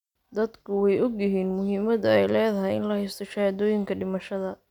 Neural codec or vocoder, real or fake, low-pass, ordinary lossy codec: none; real; 19.8 kHz; none